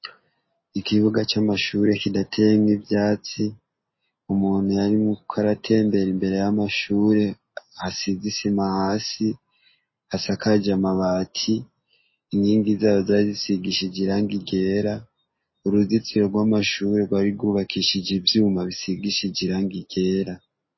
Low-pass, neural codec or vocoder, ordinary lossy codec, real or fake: 7.2 kHz; none; MP3, 24 kbps; real